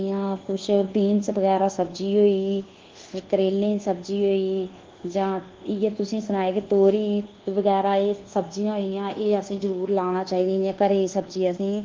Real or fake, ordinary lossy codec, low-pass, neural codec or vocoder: fake; Opus, 16 kbps; 7.2 kHz; codec, 24 kHz, 1.2 kbps, DualCodec